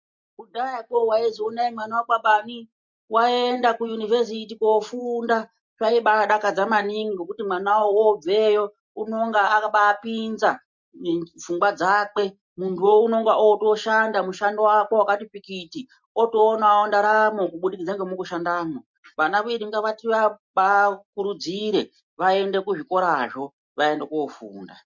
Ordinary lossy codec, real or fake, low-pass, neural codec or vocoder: MP3, 48 kbps; real; 7.2 kHz; none